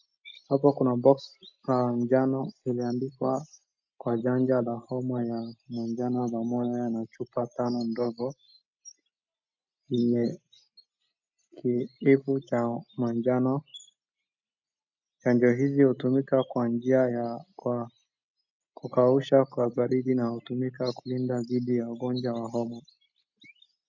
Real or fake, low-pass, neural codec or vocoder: real; 7.2 kHz; none